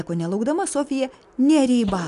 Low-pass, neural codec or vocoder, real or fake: 10.8 kHz; none; real